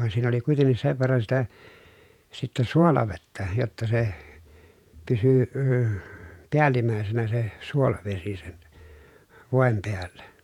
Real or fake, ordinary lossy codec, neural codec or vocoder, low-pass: fake; none; vocoder, 48 kHz, 128 mel bands, Vocos; 19.8 kHz